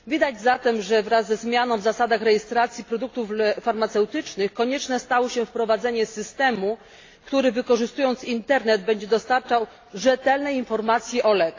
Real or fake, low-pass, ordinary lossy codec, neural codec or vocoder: real; 7.2 kHz; AAC, 32 kbps; none